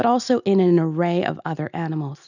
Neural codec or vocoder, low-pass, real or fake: none; 7.2 kHz; real